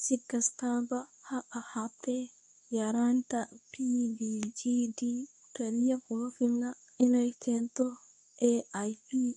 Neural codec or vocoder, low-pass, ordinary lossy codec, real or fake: codec, 24 kHz, 0.9 kbps, WavTokenizer, medium speech release version 2; 10.8 kHz; none; fake